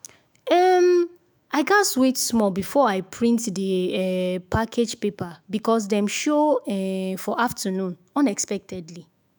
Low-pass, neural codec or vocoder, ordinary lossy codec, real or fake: none; autoencoder, 48 kHz, 128 numbers a frame, DAC-VAE, trained on Japanese speech; none; fake